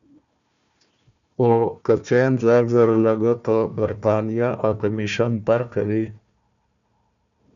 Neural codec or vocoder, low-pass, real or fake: codec, 16 kHz, 1 kbps, FunCodec, trained on Chinese and English, 50 frames a second; 7.2 kHz; fake